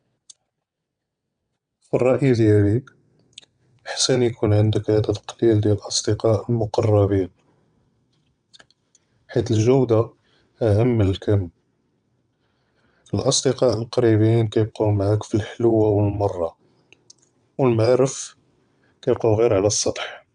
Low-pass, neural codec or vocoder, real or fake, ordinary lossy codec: 9.9 kHz; vocoder, 22.05 kHz, 80 mel bands, WaveNeXt; fake; none